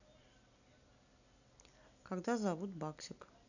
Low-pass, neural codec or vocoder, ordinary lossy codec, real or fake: 7.2 kHz; none; none; real